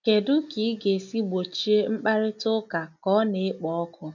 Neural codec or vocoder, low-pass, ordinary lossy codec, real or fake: none; 7.2 kHz; none; real